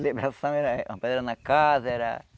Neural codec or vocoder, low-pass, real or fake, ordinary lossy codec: none; none; real; none